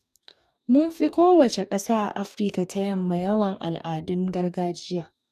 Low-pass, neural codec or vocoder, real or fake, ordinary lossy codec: 14.4 kHz; codec, 44.1 kHz, 2.6 kbps, DAC; fake; none